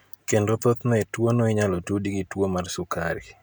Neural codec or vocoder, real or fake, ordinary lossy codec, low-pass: none; real; none; none